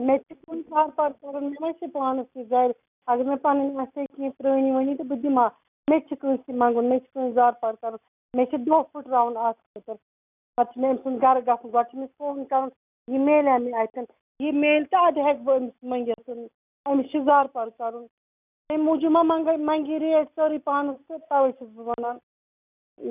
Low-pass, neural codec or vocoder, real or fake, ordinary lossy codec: 3.6 kHz; none; real; none